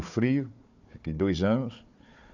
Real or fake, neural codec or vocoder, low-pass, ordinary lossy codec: fake; codec, 16 kHz, 4 kbps, FunCodec, trained on Chinese and English, 50 frames a second; 7.2 kHz; none